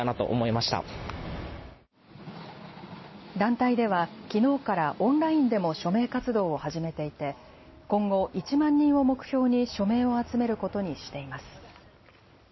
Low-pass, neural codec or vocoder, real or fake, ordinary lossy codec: 7.2 kHz; none; real; MP3, 24 kbps